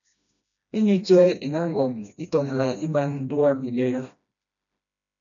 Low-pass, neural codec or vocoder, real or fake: 7.2 kHz; codec, 16 kHz, 1 kbps, FreqCodec, smaller model; fake